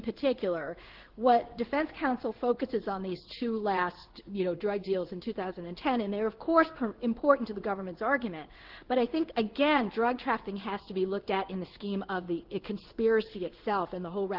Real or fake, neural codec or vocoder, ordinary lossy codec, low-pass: fake; codec, 16 kHz in and 24 kHz out, 1 kbps, XY-Tokenizer; Opus, 16 kbps; 5.4 kHz